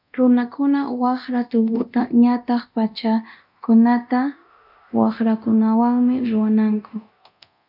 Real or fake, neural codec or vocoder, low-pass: fake; codec, 24 kHz, 0.9 kbps, DualCodec; 5.4 kHz